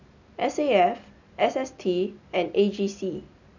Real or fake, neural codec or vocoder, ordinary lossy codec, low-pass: real; none; none; 7.2 kHz